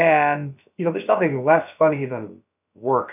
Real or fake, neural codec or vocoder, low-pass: fake; codec, 16 kHz, about 1 kbps, DyCAST, with the encoder's durations; 3.6 kHz